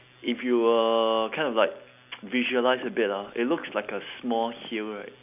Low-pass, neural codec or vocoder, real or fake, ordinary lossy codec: 3.6 kHz; none; real; none